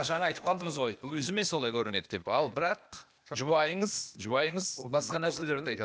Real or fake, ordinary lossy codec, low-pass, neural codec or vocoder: fake; none; none; codec, 16 kHz, 0.8 kbps, ZipCodec